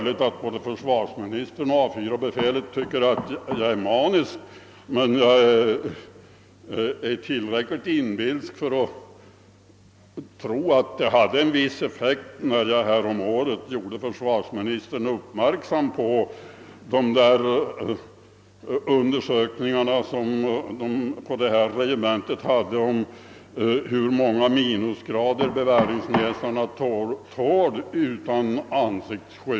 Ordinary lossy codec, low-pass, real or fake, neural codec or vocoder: none; none; real; none